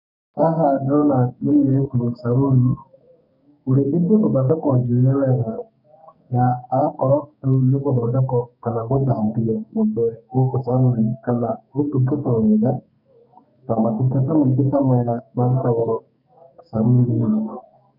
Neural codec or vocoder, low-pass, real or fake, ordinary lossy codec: codec, 44.1 kHz, 2.6 kbps, SNAC; 5.4 kHz; fake; none